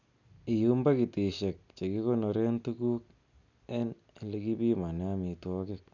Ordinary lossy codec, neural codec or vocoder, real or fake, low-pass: none; none; real; 7.2 kHz